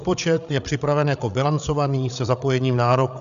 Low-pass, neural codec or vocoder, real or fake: 7.2 kHz; codec, 16 kHz, 8 kbps, FreqCodec, larger model; fake